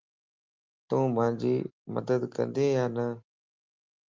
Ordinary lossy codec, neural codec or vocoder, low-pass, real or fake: Opus, 32 kbps; none; 7.2 kHz; real